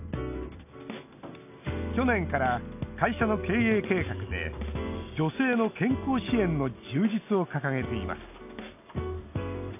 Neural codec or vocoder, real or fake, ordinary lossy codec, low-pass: none; real; none; 3.6 kHz